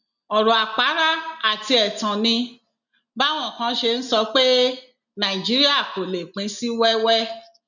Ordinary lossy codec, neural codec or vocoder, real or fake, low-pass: none; none; real; 7.2 kHz